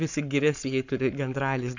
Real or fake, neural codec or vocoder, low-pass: fake; codec, 44.1 kHz, 7.8 kbps, Pupu-Codec; 7.2 kHz